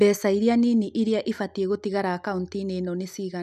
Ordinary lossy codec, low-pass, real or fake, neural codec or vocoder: none; 14.4 kHz; real; none